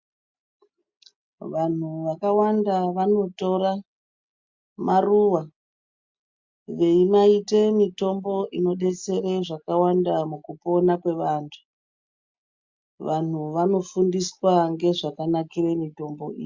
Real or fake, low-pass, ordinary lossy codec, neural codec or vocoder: real; 7.2 kHz; MP3, 64 kbps; none